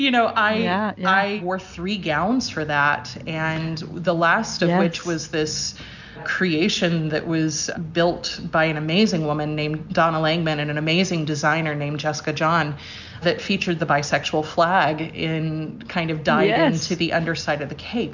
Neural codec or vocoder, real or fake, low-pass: none; real; 7.2 kHz